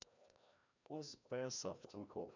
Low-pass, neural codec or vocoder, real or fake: 7.2 kHz; codec, 16 kHz, 1 kbps, FreqCodec, larger model; fake